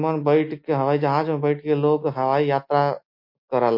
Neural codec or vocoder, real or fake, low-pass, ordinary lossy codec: none; real; 5.4 kHz; MP3, 32 kbps